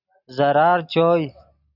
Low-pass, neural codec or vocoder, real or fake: 5.4 kHz; none; real